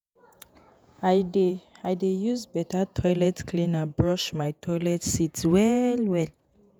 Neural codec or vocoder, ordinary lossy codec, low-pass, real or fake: vocoder, 48 kHz, 128 mel bands, Vocos; none; none; fake